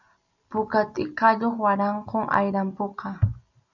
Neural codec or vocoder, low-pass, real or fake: none; 7.2 kHz; real